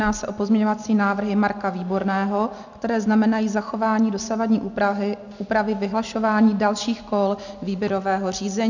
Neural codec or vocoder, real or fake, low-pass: none; real; 7.2 kHz